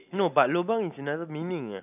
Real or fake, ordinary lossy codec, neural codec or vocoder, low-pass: real; none; none; 3.6 kHz